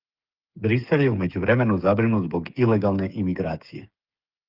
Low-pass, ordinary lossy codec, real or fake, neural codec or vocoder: 5.4 kHz; Opus, 32 kbps; fake; codec, 16 kHz, 8 kbps, FreqCodec, smaller model